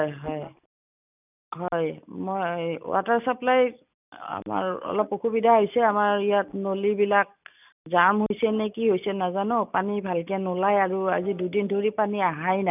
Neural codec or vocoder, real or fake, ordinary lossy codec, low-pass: none; real; none; 3.6 kHz